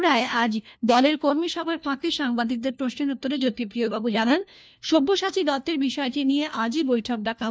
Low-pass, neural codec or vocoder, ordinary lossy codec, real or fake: none; codec, 16 kHz, 1 kbps, FunCodec, trained on LibriTTS, 50 frames a second; none; fake